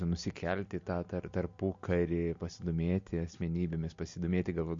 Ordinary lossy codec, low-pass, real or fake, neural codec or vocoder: MP3, 48 kbps; 7.2 kHz; real; none